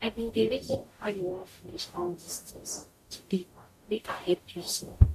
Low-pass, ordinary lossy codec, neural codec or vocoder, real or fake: 14.4 kHz; MP3, 64 kbps; codec, 44.1 kHz, 0.9 kbps, DAC; fake